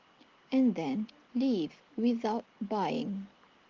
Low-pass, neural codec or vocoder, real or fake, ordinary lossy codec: 7.2 kHz; none; real; Opus, 16 kbps